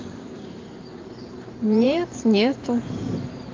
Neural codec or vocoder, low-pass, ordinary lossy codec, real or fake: vocoder, 44.1 kHz, 128 mel bands every 512 samples, BigVGAN v2; 7.2 kHz; Opus, 24 kbps; fake